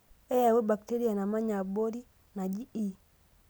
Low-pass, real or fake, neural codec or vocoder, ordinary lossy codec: none; real; none; none